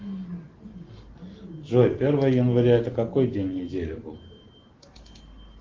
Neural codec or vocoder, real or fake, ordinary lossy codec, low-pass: none; real; Opus, 16 kbps; 7.2 kHz